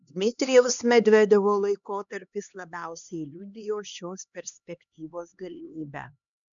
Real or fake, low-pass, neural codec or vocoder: fake; 7.2 kHz; codec, 16 kHz, 2 kbps, X-Codec, HuBERT features, trained on LibriSpeech